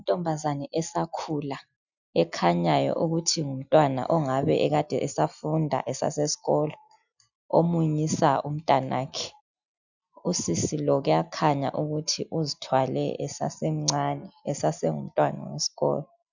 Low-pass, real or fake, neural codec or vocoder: 7.2 kHz; real; none